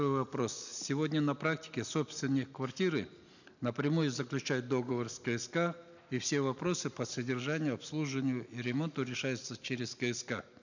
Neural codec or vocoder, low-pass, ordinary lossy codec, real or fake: none; 7.2 kHz; none; real